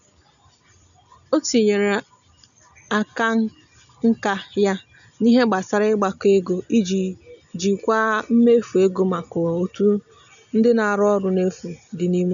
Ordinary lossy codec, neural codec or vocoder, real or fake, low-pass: none; none; real; 7.2 kHz